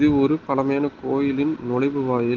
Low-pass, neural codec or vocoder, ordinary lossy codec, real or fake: 7.2 kHz; none; Opus, 16 kbps; real